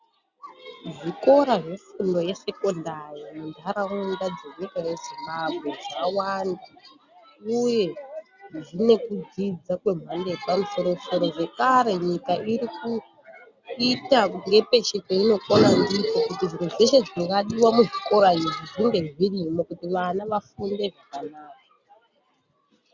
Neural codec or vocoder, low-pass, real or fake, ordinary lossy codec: none; 7.2 kHz; real; Opus, 64 kbps